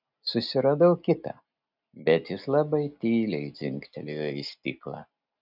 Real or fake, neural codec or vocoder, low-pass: real; none; 5.4 kHz